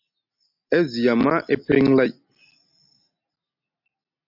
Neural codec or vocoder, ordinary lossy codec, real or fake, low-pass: none; MP3, 48 kbps; real; 5.4 kHz